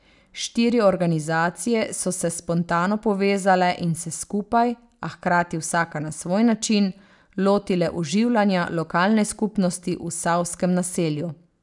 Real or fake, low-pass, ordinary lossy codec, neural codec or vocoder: real; 10.8 kHz; none; none